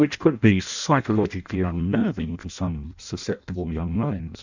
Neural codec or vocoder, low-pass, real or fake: codec, 16 kHz in and 24 kHz out, 0.6 kbps, FireRedTTS-2 codec; 7.2 kHz; fake